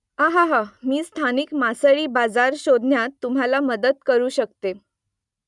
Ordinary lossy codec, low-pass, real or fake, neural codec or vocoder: none; 10.8 kHz; real; none